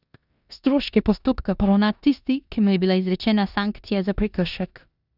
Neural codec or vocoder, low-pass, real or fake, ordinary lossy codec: codec, 16 kHz in and 24 kHz out, 0.9 kbps, LongCat-Audio-Codec, four codebook decoder; 5.4 kHz; fake; none